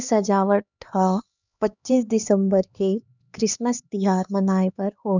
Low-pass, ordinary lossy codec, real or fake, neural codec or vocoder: 7.2 kHz; none; fake; codec, 16 kHz, 2 kbps, X-Codec, HuBERT features, trained on LibriSpeech